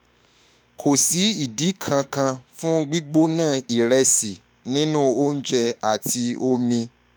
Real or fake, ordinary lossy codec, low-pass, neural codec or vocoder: fake; none; none; autoencoder, 48 kHz, 32 numbers a frame, DAC-VAE, trained on Japanese speech